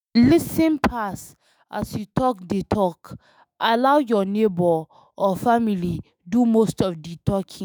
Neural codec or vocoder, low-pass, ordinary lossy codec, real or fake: autoencoder, 48 kHz, 128 numbers a frame, DAC-VAE, trained on Japanese speech; none; none; fake